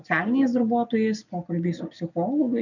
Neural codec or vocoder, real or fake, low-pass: none; real; 7.2 kHz